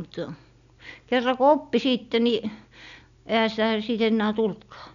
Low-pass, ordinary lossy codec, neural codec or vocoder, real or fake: 7.2 kHz; none; none; real